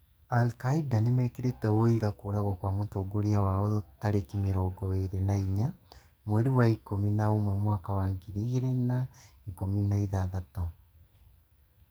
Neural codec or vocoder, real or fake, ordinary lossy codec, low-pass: codec, 44.1 kHz, 2.6 kbps, SNAC; fake; none; none